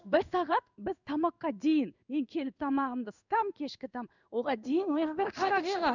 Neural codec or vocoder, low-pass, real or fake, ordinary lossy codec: codec, 16 kHz in and 24 kHz out, 1 kbps, XY-Tokenizer; 7.2 kHz; fake; none